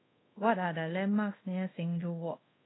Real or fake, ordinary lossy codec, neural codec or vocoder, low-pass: fake; AAC, 16 kbps; codec, 24 kHz, 0.5 kbps, DualCodec; 7.2 kHz